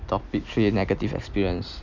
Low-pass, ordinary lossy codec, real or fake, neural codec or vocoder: 7.2 kHz; none; real; none